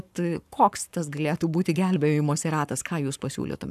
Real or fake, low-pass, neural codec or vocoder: fake; 14.4 kHz; codec, 44.1 kHz, 7.8 kbps, Pupu-Codec